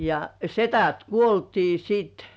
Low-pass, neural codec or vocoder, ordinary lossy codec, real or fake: none; none; none; real